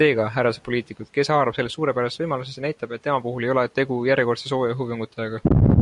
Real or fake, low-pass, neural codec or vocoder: real; 10.8 kHz; none